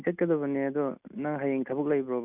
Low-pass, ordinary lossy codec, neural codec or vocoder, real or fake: 3.6 kHz; none; none; real